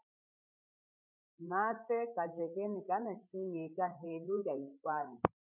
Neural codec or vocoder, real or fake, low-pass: codec, 16 kHz, 8 kbps, FreqCodec, larger model; fake; 3.6 kHz